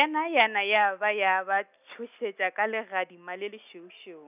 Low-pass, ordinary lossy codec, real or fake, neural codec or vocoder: 3.6 kHz; none; real; none